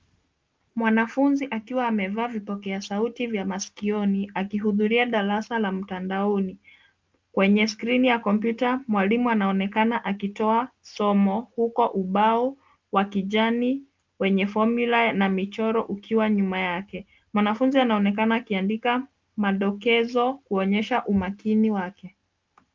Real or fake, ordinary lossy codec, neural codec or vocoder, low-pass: real; Opus, 24 kbps; none; 7.2 kHz